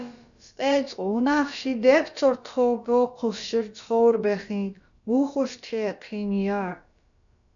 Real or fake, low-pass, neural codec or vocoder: fake; 7.2 kHz; codec, 16 kHz, about 1 kbps, DyCAST, with the encoder's durations